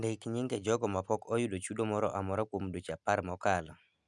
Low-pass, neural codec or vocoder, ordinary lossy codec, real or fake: 10.8 kHz; none; none; real